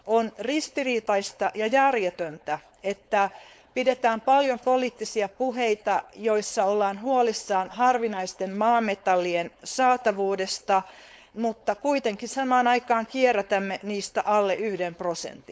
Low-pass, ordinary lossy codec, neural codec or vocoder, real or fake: none; none; codec, 16 kHz, 4.8 kbps, FACodec; fake